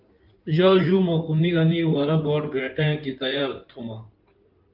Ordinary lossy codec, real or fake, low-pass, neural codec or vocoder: Opus, 24 kbps; fake; 5.4 kHz; codec, 16 kHz in and 24 kHz out, 2.2 kbps, FireRedTTS-2 codec